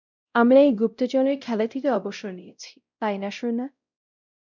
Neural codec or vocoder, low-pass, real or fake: codec, 16 kHz, 0.5 kbps, X-Codec, WavLM features, trained on Multilingual LibriSpeech; 7.2 kHz; fake